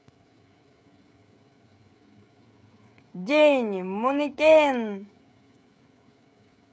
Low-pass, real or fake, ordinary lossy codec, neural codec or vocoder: none; fake; none; codec, 16 kHz, 16 kbps, FreqCodec, smaller model